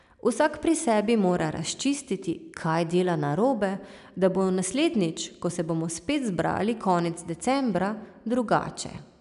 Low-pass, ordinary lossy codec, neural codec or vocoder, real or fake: 10.8 kHz; none; none; real